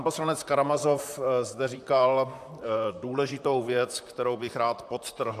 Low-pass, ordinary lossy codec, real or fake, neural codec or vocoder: 14.4 kHz; AAC, 96 kbps; fake; vocoder, 44.1 kHz, 128 mel bands, Pupu-Vocoder